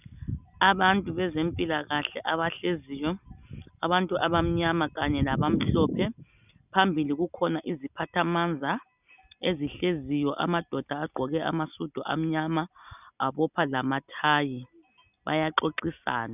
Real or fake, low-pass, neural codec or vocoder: real; 3.6 kHz; none